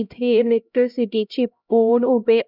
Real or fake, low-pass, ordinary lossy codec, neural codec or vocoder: fake; 5.4 kHz; none; codec, 16 kHz, 0.5 kbps, X-Codec, HuBERT features, trained on LibriSpeech